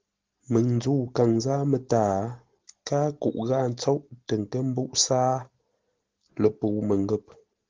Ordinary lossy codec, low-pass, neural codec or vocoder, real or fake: Opus, 16 kbps; 7.2 kHz; none; real